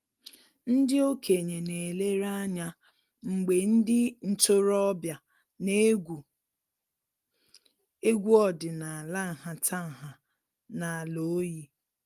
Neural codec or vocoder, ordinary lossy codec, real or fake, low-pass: none; Opus, 32 kbps; real; 14.4 kHz